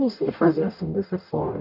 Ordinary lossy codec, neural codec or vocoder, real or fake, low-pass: none; codec, 44.1 kHz, 0.9 kbps, DAC; fake; 5.4 kHz